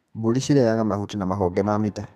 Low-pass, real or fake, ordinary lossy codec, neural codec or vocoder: 14.4 kHz; fake; MP3, 96 kbps; codec, 32 kHz, 1.9 kbps, SNAC